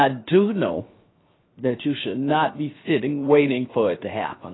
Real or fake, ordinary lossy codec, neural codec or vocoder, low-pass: fake; AAC, 16 kbps; codec, 16 kHz, 0.7 kbps, FocalCodec; 7.2 kHz